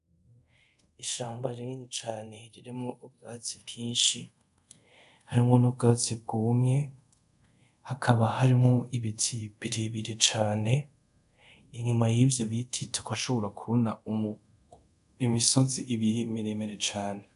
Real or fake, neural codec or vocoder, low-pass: fake; codec, 24 kHz, 0.5 kbps, DualCodec; 10.8 kHz